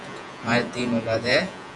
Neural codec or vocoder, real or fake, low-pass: vocoder, 48 kHz, 128 mel bands, Vocos; fake; 10.8 kHz